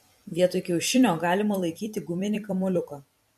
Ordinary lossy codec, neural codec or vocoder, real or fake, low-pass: MP3, 64 kbps; vocoder, 44.1 kHz, 128 mel bands every 256 samples, BigVGAN v2; fake; 14.4 kHz